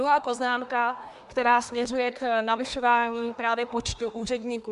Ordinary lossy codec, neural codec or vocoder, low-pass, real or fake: AAC, 96 kbps; codec, 24 kHz, 1 kbps, SNAC; 10.8 kHz; fake